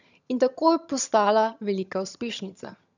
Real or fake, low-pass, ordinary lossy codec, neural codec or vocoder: fake; 7.2 kHz; none; vocoder, 22.05 kHz, 80 mel bands, HiFi-GAN